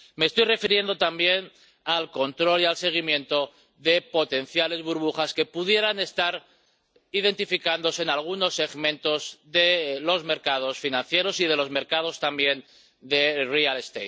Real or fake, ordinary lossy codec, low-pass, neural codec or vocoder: real; none; none; none